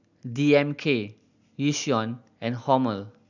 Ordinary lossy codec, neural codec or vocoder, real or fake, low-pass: none; none; real; 7.2 kHz